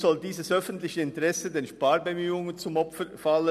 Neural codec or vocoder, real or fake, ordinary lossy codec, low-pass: none; real; none; 14.4 kHz